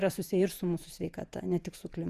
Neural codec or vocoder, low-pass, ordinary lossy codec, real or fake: vocoder, 48 kHz, 128 mel bands, Vocos; 14.4 kHz; Opus, 64 kbps; fake